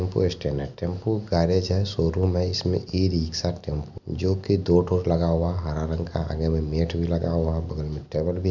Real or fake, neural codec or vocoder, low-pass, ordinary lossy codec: real; none; 7.2 kHz; none